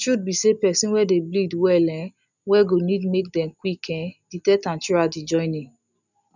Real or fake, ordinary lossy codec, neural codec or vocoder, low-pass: real; none; none; 7.2 kHz